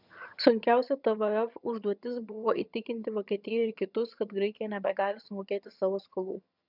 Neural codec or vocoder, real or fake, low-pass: vocoder, 22.05 kHz, 80 mel bands, HiFi-GAN; fake; 5.4 kHz